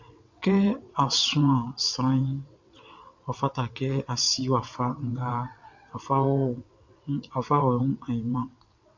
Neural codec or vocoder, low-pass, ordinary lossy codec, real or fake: vocoder, 22.05 kHz, 80 mel bands, WaveNeXt; 7.2 kHz; MP3, 64 kbps; fake